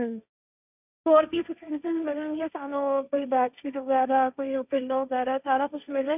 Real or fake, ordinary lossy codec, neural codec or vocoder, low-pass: fake; none; codec, 16 kHz, 1.1 kbps, Voila-Tokenizer; 3.6 kHz